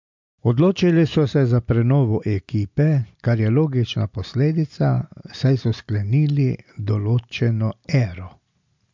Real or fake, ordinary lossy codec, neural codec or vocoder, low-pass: real; MP3, 64 kbps; none; 7.2 kHz